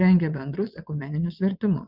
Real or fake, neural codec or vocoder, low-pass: fake; vocoder, 22.05 kHz, 80 mel bands, WaveNeXt; 5.4 kHz